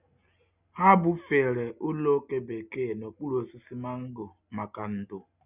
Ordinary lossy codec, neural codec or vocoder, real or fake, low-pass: none; none; real; 3.6 kHz